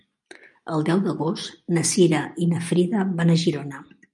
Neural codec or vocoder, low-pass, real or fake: none; 10.8 kHz; real